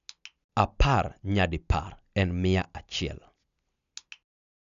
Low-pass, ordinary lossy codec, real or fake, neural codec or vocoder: 7.2 kHz; none; real; none